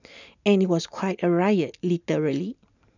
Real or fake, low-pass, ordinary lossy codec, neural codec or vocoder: real; 7.2 kHz; none; none